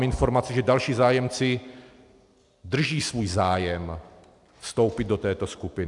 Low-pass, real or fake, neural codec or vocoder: 10.8 kHz; real; none